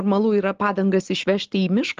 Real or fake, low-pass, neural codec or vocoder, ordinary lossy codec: real; 7.2 kHz; none; Opus, 16 kbps